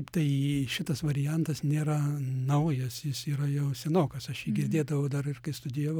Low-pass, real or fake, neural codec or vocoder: 19.8 kHz; real; none